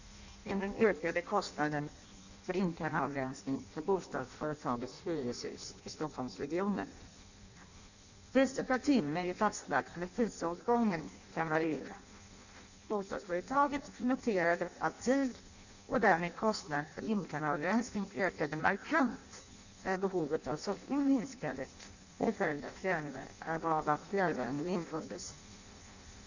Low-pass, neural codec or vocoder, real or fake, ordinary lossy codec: 7.2 kHz; codec, 16 kHz in and 24 kHz out, 0.6 kbps, FireRedTTS-2 codec; fake; none